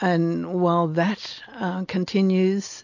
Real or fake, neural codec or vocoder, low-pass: real; none; 7.2 kHz